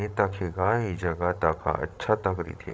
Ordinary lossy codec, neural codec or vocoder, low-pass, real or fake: none; codec, 16 kHz, 16 kbps, FreqCodec, larger model; none; fake